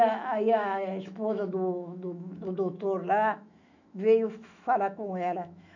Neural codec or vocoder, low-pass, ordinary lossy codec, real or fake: autoencoder, 48 kHz, 128 numbers a frame, DAC-VAE, trained on Japanese speech; 7.2 kHz; none; fake